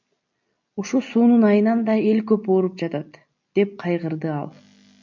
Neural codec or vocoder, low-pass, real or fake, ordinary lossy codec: none; 7.2 kHz; real; MP3, 64 kbps